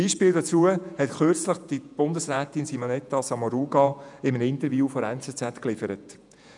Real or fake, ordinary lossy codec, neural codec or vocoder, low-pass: fake; none; vocoder, 48 kHz, 128 mel bands, Vocos; 10.8 kHz